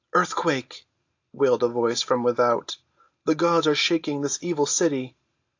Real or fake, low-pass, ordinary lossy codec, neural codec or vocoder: real; 7.2 kHz; AAC, 48 kbps; none